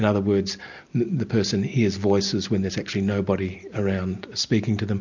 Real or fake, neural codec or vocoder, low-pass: real; none; 7.2 kHz